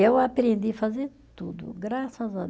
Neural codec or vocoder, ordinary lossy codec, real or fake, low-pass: none; none; real; none